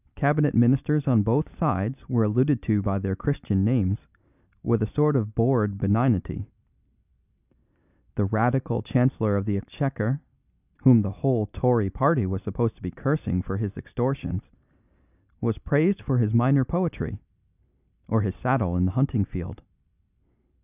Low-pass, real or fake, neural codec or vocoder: 3.6 kHz; real; none